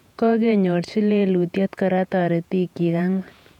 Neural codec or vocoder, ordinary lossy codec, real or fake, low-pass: vocoder, 48 kHz, 128 mel bands, Vocos; none; fake; 19.8 kHz